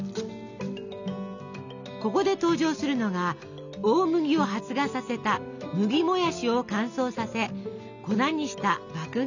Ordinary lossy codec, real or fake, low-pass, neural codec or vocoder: none; real; 7.2 kHz; none